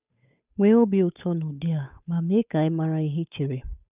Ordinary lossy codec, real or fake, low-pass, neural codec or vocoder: none; fake; 3.6 kHz; codec, 16 kHz, 8 kbps, FunCodec, trained on Chinese and English, 25 frames a second